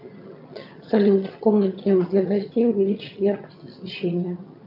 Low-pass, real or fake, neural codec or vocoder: 5.4 kHz; fake; vocoder, 22.05 kHz, 80 mel bands, HiFi-GAN